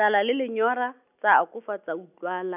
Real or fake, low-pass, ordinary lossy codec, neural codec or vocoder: real; 3.6 kHz; none; none